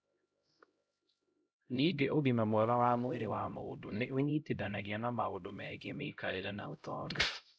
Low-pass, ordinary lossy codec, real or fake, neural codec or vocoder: none; none; fake; codec, 16 kHz, 0.5 kbps, X-Codec, HuBERT features, trained on LibriSpeech